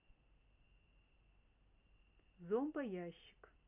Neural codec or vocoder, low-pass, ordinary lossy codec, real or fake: none; 3.6 kHz; none; real